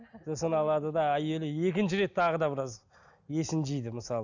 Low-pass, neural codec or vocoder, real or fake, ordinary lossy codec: 7.2 kHz; none; real; none